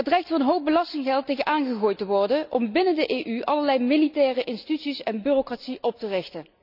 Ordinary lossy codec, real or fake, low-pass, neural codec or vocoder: none; real; 5.4 kHz; none